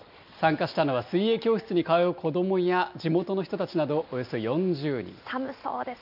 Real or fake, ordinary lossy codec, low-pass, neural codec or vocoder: real; none; 5.4 kHz; none